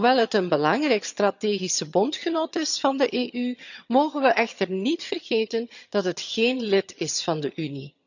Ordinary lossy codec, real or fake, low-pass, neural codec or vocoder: none; fake; 7.2 kHz; vocoder, 22.05 kHz, 80 mel bands, HiFi-GAN